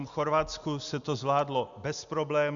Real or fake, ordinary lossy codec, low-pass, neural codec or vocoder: real; Opus, 64 kbps; 7.2 kHz; none